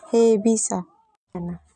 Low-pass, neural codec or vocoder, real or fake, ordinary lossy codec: 10.8 kHz; none; real; none